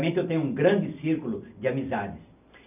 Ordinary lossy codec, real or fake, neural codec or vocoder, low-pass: none; real; none; 3.6 kHz